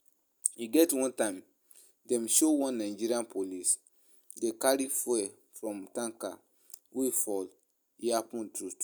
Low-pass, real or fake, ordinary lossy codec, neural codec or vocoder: none; real; none; none